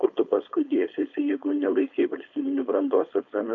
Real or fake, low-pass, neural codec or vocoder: fake; 7.2 kHz; codec, 16 kHz, 4.8 kbps, FACodec